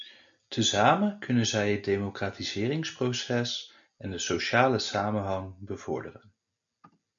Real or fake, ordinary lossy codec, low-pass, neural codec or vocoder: real; MP3, 64 kbps; 7.2 kHz; none